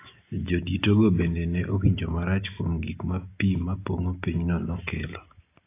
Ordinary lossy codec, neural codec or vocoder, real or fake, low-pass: AAC, 24 kbps; none; real; 3.6 kHz